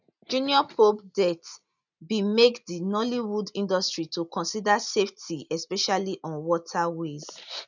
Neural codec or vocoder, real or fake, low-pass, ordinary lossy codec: none; real; 7.2 kHz; none